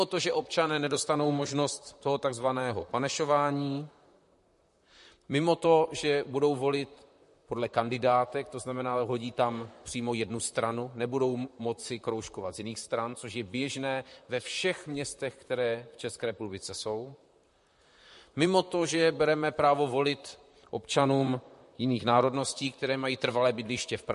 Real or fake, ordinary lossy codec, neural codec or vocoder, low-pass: fake; MP3, 48 kbps; vocoder, 44.1 kHz, 128 mel bands, Pupu-Vocoder; 14.4 kHz